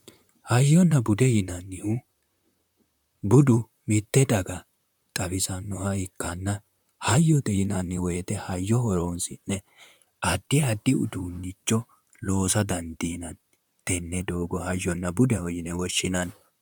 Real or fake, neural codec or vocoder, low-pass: fake; vocoder, 44.1 kHz, 128 mel bands, Pupu-Vocoder; 19.8 kHz